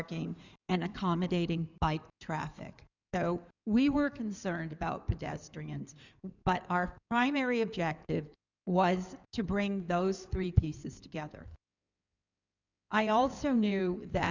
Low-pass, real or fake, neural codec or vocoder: 7.2 kHz; fake; codec, 16 kHz in and 24 kHz out, 2.2 kbps, FireRedTTS-2 codec